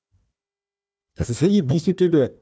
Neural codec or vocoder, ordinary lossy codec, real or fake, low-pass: codec, 16 kHz, 1 kbps, FunCodec, trained on Chinese and English, 50 frames a second; none; fake; none